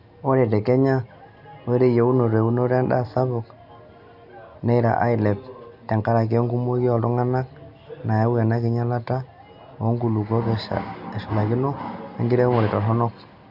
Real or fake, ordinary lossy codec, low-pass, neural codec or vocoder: real; none; 5.4 kHz; none